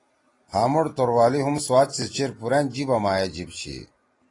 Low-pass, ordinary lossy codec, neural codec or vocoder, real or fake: 10.8 kHz; AAC, 32 kbps; none; real